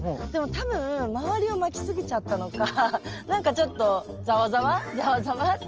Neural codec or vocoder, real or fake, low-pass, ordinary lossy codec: none; real; 7.2 kHz; Opus, 24 kbps